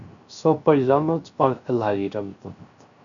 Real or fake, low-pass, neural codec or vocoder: fake; 7.2 kHz; codec, 16 kHz, 0.3 kbps, FocalCodec